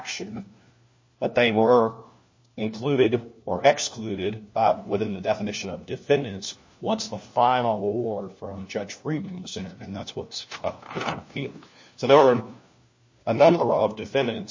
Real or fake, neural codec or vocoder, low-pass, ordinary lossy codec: fake; codec, 16 kHz, 1 kbps, FunCodec, trained on LibriTTS, 50 frames a second; 7.2 kHz; MP3, 32 kbps